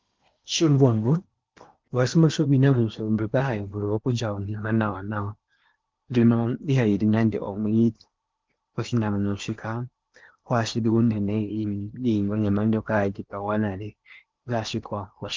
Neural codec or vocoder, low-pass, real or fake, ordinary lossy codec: codec, 16 kHz in and 24 kHz out, 0.8 kbps, FocalCodec, streaming, 65536 codes; 7.2 kHz; fake; Opus, 32 kbps